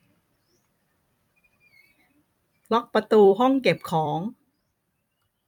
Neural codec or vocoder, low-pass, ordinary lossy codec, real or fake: vocoder, 44.1 kHz, 128 mel bands every 256 samples, BigVGAN v2; 19.8 kHz; none; fake